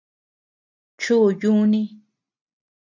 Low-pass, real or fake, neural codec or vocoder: 7.2 kHz; real; none